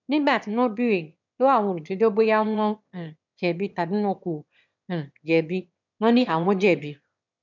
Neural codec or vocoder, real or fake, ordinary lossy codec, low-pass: autoencoder, 22.05 kHz, a latent of 192 numbers a frame, VITS, trained on one speaker; fake; none; 7.2 kHz